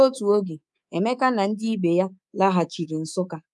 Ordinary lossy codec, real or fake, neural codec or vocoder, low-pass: none; fake; codec, 24 kHz, 3.1 kbps, DualCodec; none